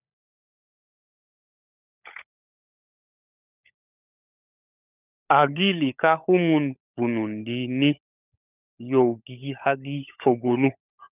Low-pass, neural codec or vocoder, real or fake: 3.6 kHz; codec, 16 kHz, 16 kbps, FunCodec, trained on LibriTTS, 50 frames a second; fake